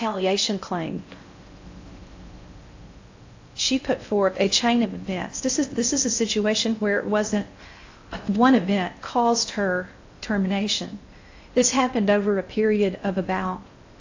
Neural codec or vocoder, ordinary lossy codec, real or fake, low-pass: codec, 16 kHz in and 24 kHz out, 0.6 kbps, FocalCodec, streaming, 4096 codes; AAC, 48 kbps; fake; 7.2 kHz